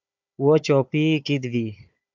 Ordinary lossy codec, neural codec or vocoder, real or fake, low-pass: MP3, 64 kbps; codec, 16 kHz, 4 kbps, FunCodec, trained on Chinese and English, 50 frames a second; fake; 7.2 kHz